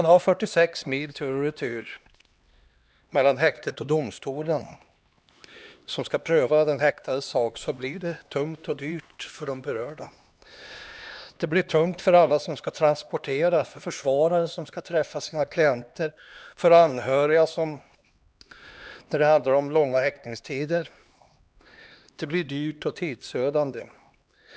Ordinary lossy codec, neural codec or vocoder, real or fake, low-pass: none; codec, 16 kHz, 2 kbps, X-Codec, HuBERT features, trained on LibriSpeech; fake; none